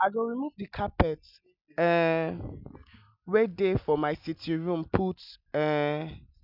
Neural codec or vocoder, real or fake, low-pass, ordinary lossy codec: none; real; 5.4 kHz; none